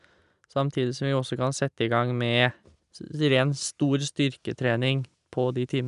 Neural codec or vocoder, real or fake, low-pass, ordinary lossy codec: none; real; 10.8 kHz; none